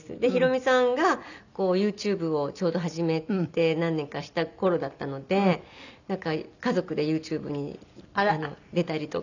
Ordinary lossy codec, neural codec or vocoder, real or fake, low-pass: none; none; real; 7.2 kHz